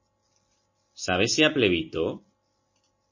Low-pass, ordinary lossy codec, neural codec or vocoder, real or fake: 7.2 kHz; MP3, 32 kbps; none; real